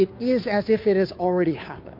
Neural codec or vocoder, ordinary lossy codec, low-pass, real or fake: codec, 16 kHz, 2 kbps, FunCodec, trained on Chinese and English, 25 frames a second; MP3, 32 kbps; 5.4 kHz; fake